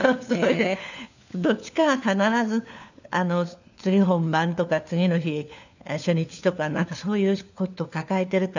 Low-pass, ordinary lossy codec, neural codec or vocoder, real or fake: 7.2 kHz; none; codec, 16 kHz, 4 kbps, FunCodec, trained on LibriTTS, 50 frames a second; fake